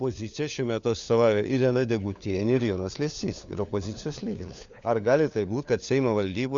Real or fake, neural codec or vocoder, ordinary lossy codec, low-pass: fake; codec, 16 kHz, 4 kbps, FunCodec, trained on LibriTTS, 50 frames a second; Opus, 64 kbps; 7.2 kHz